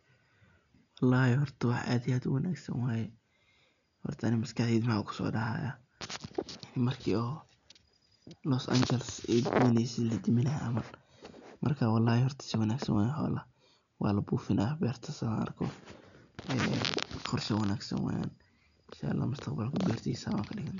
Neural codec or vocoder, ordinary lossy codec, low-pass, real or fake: none; none; 7.2 kHz; real